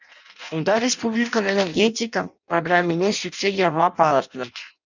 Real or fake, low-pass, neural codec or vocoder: fake; 7.2 kHz; codec, 16 kHz in and 24 kHz out, 0.6 kbps, FireRedTTS-2 codec